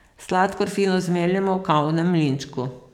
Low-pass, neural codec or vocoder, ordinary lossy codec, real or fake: 19.8 kHz; codec, 44.1 kHz, 7.8 kbps, DAC; none; fake